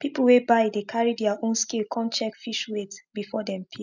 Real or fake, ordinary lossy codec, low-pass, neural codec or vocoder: real; none; 7.2 kHz; none